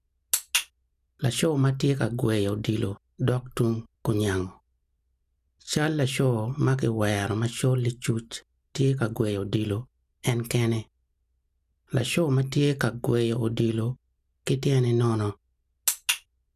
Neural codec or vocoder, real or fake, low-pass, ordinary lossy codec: none; real; 14.4 kHz; none